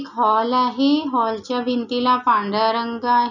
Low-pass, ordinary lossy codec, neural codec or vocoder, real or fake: 7.2 kHz; none; none; real